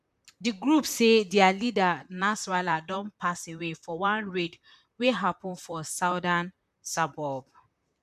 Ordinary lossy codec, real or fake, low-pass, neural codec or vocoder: none; fake; 14.4 kHz; vocoder, 44.1 kHz, 128 mel bands, Pupu-Vocoder